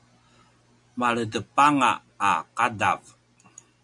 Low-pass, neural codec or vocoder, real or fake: 10.8 kHz; none; real